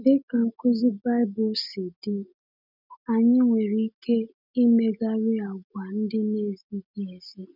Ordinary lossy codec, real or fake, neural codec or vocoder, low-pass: none; real; none; 5.4 kHz